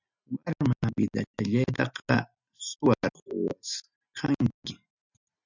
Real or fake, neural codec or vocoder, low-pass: real; none; 7.2 kHz